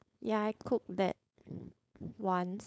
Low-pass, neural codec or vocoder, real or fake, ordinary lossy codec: none; codec, 16 kHz, 4.8 kbps, FACodec; fake; none